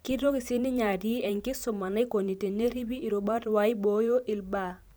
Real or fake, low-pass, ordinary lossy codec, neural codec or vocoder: fake; none; none; vocoder, 44.1 kHz, 128 mel bands every 512 samples, BigVGAN v2